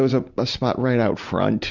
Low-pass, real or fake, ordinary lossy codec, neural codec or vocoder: 7.2 kHz; real; Opus, 64 kbps; none